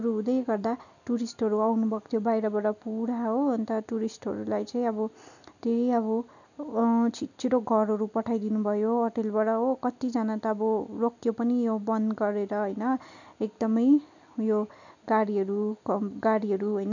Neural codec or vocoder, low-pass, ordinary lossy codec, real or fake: none; 7.2 kHz; none; real